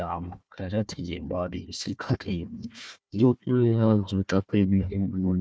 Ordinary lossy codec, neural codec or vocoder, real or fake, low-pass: none; codec, 16 kHz, 1 kbps, FunCodec, trained on Chinese and English, 50 frames a second; fake; none